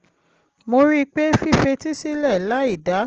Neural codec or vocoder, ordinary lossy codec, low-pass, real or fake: none; Opus, 16 kbps; 7.2 kHz; real